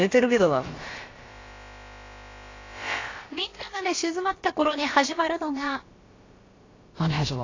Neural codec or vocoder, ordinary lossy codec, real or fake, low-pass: codec, 16 kHz, about 1 kbps, DyCAST, with the encoder's durations; AAC, 32 kbps; fake; 7.2 kHz